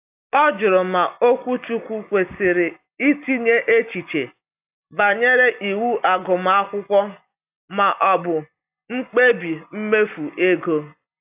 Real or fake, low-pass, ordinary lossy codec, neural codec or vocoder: real; 3.6 kHz; AAC, 32 kbps; none